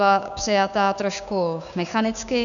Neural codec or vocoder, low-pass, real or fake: codec, 16 kHz, 6 kbps, DAC; 7.2 kHz; fake